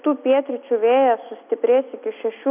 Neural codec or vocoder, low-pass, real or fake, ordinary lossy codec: none; 3.6 kHz; real; AAC, 32 kbps